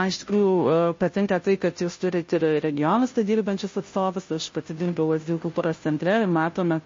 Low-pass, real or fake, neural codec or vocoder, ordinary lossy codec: 7.2 kHz; fake; codec, 16 kHz, 0.5 kbps, FunCodec, trained on Chinese and English, 25 frames a second; MP3, 32 kbps